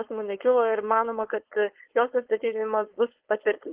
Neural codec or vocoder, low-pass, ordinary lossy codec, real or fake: codec, 16 kHz, 4.8 kbps, FACodec; 3.6 kHz; Opus, 16 kbps; fake